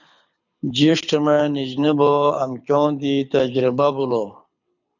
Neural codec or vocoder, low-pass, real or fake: codec, 24 kHz, 6 kbps, HILCodec; 7.2 kHz; fake